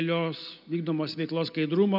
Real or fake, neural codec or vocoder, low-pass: real; none; 5.4 kHz